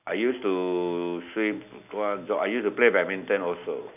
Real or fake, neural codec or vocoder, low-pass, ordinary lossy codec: real; none; 3.6 kHz; none